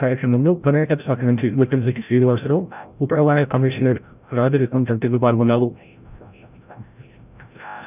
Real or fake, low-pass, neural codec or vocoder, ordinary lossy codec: fake; 3.6 kHz; codec, 16 kHz, 0.5 kbps, FreqCodec, larger model; none